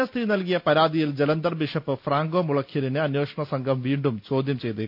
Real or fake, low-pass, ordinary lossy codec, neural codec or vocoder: real; 5.4 kHz; none; none